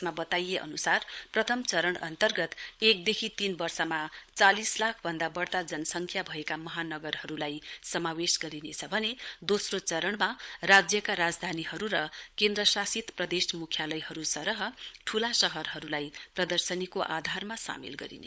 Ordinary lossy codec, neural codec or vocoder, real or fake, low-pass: none; codec, 16 kHz, 16 kbps, FunCodec, trained on LibriTTS, 50 frames a second; fake; none